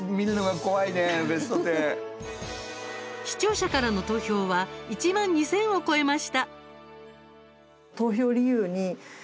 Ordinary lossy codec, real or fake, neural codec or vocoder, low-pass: none; real; none; none